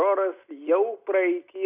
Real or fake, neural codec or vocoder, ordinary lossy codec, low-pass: real; none; AAC, 32 kbps; 3.6 kHz